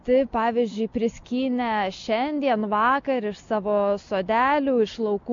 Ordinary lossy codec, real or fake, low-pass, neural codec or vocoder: MP3, 48 kbps; real; 7.2 kHz; none